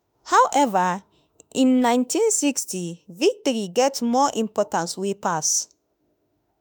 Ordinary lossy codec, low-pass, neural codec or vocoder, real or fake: none; none; autoencoder, 48 kHz, 32 numbers a frame, DAC-VAE, trained on Japanese speech; fake